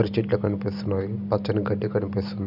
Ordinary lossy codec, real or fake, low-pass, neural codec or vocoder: none; real; 5.4 kHz; none